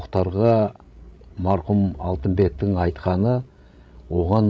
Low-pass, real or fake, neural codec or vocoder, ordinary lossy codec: none; fake; codec, 16 kHz, 16 kbps, FreqCodec, larger model; none